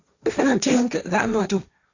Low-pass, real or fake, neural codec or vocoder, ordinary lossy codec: 7.2 kHz; fake; codec, 16 kHz, 1.1 kbps, Voila-Tokenizer; Opus, 64 kbps